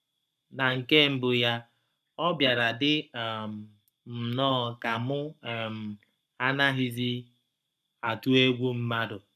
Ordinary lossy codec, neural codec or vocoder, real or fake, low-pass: none; codec, 44.1 kHz, 7.8 kbps, Pupu-Codec; fake; 14.4 kHz